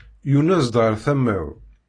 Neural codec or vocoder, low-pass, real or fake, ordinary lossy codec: none; 9.9 kHz; real; AAC, 32 kbps